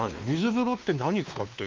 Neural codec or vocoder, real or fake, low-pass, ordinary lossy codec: codec, 16 kHz, 2 kbps, X-Codec, WavLM features, trained on Multilingual LibriSpeech; fake; 7.2 kHz; Opus, 24 kbps